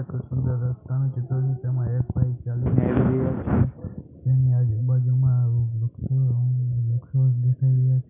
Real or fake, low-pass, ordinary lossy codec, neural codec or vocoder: real; 3.6 kHz; none; none